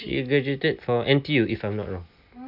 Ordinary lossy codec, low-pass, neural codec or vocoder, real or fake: none; 5.4 kHz; none; real